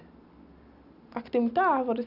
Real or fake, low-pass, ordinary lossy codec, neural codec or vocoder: real; 5.4 kHz; none; none